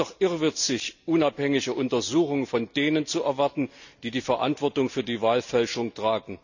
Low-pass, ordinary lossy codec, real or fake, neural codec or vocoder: 7.2 kHz; none; real; none